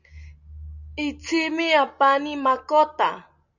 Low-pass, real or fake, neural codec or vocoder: 7.2 kHz; real; none